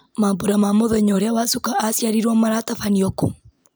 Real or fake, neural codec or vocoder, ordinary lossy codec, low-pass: real; none; none; none